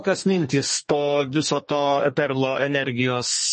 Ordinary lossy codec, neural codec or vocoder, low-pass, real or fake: MP3, 32 kbps; codec, 32 kHz, 1.9 kbps, SNAC; 10.8 kHz; fake